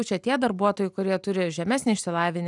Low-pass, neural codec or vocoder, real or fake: 10.8 kHz; none; real